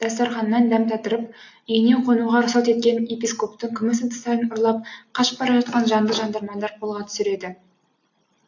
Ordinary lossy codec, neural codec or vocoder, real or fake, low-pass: AAC, 48 kbps; none; real; 7.2 kHz